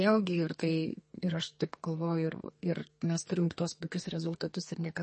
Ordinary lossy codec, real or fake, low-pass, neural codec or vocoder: MP3, 32 kbps; fake; 10.8 kHz; codec, 32 kHz, 1.9 kbps, SNAC